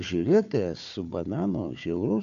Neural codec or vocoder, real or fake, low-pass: codec, 16 kHz, 4 kbps, FunCodec, trained on Chinese and English, 50 frames a second; fake; 7.2 kHz